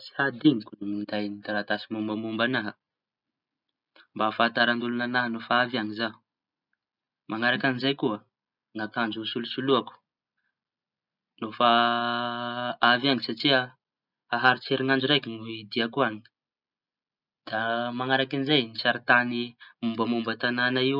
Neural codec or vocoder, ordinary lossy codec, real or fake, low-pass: none; none; real; 5.4 kHz